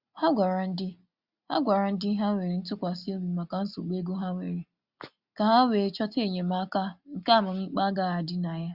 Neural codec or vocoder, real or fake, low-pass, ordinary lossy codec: none; real; 5.4 kHz; Opus, 64 kbps